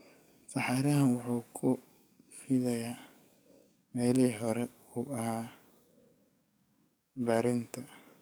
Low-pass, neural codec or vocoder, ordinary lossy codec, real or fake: none; codec, 44.1 kHz, 7.8 kbps, DAC; none; fake